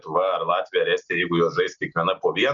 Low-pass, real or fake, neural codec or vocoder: 7.2 kHz; real; none